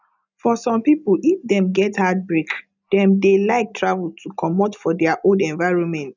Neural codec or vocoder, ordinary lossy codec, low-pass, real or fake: none; none; 7.2 kHz; real